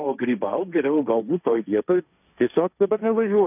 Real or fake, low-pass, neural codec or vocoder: fake; 3.6 kHz; codec, 16 kHz, 1.1 kbps, Voila-Tokenizer